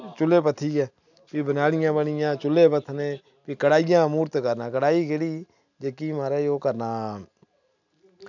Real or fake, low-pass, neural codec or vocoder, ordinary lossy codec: real; 7.2 kHz; none; none